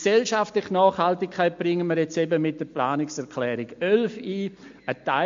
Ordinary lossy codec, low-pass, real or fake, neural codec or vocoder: MP3, 48 kbps; 7.2 kHz; real; none